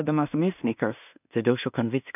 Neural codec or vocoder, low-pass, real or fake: codec, 16 kHz in and 24 kHz out, 0.4 kbps, LongCat-Audio-Codec, two codebook decoder; 3.6 kHz; fake